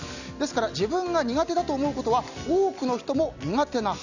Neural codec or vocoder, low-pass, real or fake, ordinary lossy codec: none; 7.2 kHz; real; none